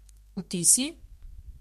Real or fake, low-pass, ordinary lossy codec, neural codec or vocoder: fake; 14.4 kHz; MP3, 64 kbps; codec, 32 kHz, 1.9 kbps, SNAC